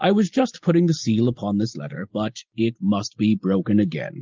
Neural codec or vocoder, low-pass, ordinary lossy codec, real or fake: none; 7.2 kHz; Opus, 24 kbps; real